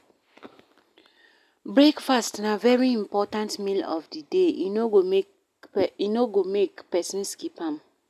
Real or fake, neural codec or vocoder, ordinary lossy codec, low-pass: real; none; none; 14.4 kHz